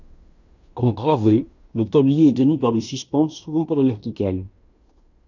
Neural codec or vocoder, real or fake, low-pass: codec, 16 kHz in and 24 kHz out, 0.9 kbps, LongCat-Audio-Codec, fine tuned four codebook decoder; fake; 7.2 kHz